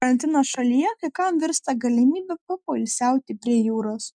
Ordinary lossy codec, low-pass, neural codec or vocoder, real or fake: MP3, 96 kbps; 9.9 kHz; none; real